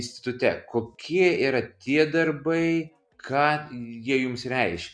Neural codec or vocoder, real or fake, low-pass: none; real; 9.9 kHz